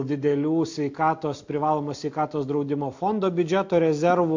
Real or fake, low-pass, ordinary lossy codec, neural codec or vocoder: real; 7.2 kHz; MP3, 48 kbps; none